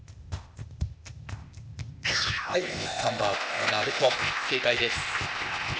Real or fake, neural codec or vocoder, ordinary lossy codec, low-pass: fake; codec, 16 kHz, 0.8 kbps, ZipCodec; none; none